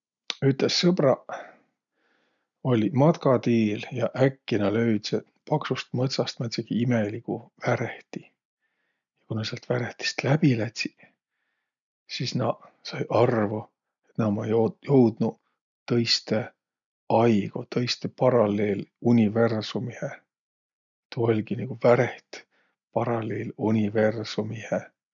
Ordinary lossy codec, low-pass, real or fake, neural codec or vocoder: none; 7.2 kHz; real; none